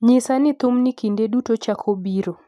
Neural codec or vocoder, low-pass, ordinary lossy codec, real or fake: none; 14.4 kHz; none; real